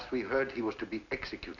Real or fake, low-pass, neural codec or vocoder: real; 7.2 kHz; none